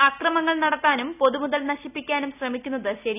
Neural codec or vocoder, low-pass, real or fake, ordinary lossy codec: none; 3.6 kHz; real; none